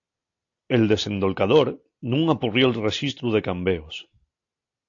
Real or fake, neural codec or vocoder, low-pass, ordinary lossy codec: real; none; 7.2 kHz; AAC, 48 kbps